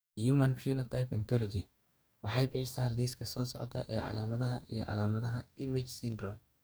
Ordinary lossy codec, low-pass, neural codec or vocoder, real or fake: none; none; codec, 44.1 kHz, 2.6 kbps, DAC; fake